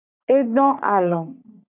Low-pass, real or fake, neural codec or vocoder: 3.6 kHz; fake; codec, 44.1 kHz, 3.4 kbps, Pupu-Codec